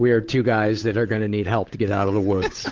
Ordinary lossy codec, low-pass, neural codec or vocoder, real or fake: Opus, 16 kbps; 7.2 kHz; codec, 16 kHz, 8 kbps, FunCodec, trained on Chinese and English, 25 frames a second; fake